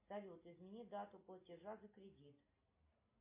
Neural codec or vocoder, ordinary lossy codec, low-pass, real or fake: none; MP3, 24 kbps; 3.6 kHz; real